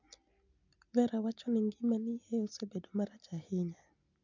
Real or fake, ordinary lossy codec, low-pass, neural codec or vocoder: real; none; 7.2 kHz; none